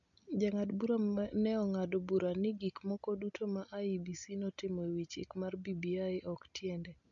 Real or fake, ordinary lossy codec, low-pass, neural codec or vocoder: real; none; 7.2 kHz; none